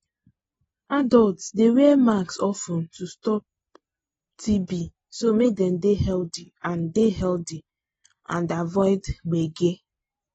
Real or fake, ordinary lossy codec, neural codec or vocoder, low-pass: real; AAC, 24 kbps; none; 19.8 kHz